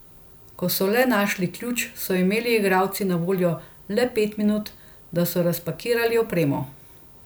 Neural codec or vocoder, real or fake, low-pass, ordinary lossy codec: none; real; none; none